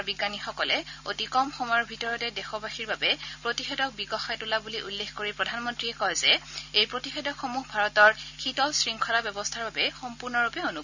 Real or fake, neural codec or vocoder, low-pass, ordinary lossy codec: real; none; 7.2 kHz; none